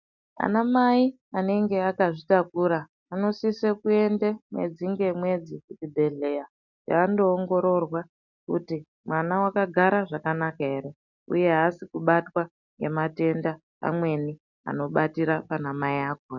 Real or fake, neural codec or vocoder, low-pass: real; none; 7.2 kHz